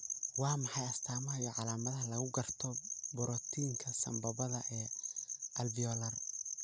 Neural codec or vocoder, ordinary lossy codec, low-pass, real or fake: none; none; none; real